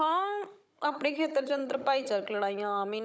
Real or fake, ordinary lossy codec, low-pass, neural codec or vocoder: fake; none; none; codec, 16 kHz, 16 kbps, FunCodec, trained on Chinese and English, 50 frames a second